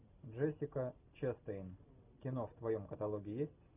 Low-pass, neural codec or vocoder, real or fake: 3.6 kHz; none; real